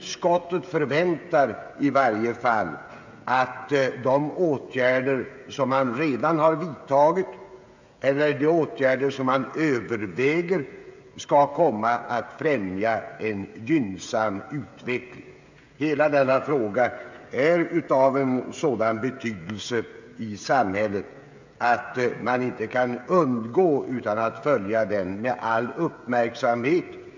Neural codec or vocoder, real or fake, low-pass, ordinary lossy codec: codec, 16 kHz, 16 kbps, FreqCodec, smaller model; fake; 7.2 kHz; MP3, 64 kbps